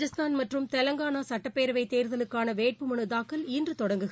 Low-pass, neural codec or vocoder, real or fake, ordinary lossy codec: none; none; real; none